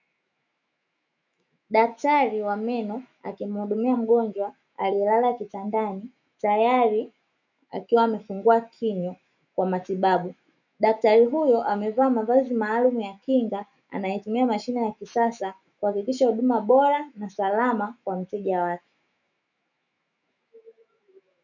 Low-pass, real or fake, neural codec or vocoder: 7.2 kHz; fake; autoencoder, 48 kHz, 128 numbers a frame, DAC-VAE, trained on Japanese speech